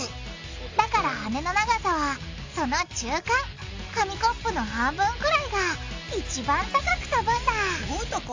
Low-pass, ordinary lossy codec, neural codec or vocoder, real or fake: 7.2 kHz; AAC, 48 kbps; none; real